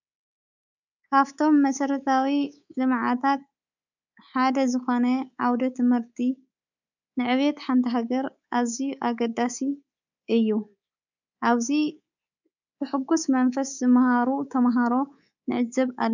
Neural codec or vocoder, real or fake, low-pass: codec, 24 kHz, 3.1 kbps, DualCodec; fake; 7.2 kHz